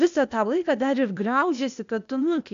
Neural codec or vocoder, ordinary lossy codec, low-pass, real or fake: codec, 16 kHz, 0.8 kbps, ZipCodec; MP3, 64 kbps; 7.2 kHz; fake